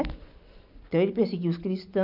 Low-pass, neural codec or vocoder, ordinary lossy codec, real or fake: 5.4 kHz; none; none; real